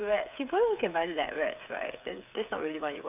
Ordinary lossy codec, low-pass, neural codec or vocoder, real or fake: none; 3.6 kHz; codec, 16 kHz, 8 kbps, FreqCodec, smaller model; fake